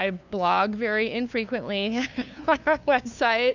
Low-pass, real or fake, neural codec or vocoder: 7.2 kHz; fake; codec, 16 kHz, 2 kbps, FunCodec, trained on LibriTTS, 25 frames a second